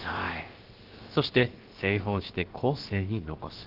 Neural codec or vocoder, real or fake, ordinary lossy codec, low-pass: codec, 16 kHz, about 1 kbps, DyCAST, with the encoder's durations; fake; Opus, 16 kbps; 5.4 kHz